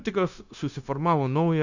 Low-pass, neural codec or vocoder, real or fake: 7.2 kHz; codec, 16 kHz, 0.9 kbps, LongCat-Audio-Codec; fake